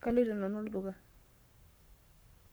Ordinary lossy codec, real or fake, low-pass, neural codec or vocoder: none; fake; none; codec, 44.1 kHz, 7.8 kbps, Pupu-Codec